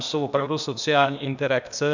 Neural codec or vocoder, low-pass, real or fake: codec, 16 kHz, 0.8 kbps, ZipCodec; 7.2 kHz; fake